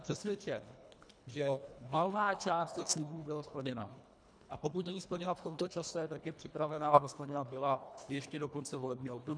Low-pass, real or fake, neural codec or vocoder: 9.9 kHz; fake; codec, 24 kHz, 1.5 kbps, HILCodec